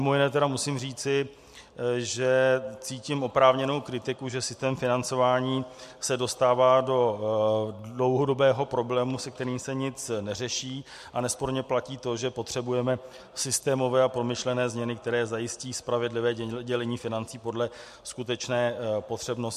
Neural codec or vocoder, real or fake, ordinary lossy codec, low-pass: none; real; MP3, 64 kbps; 14.4 kHz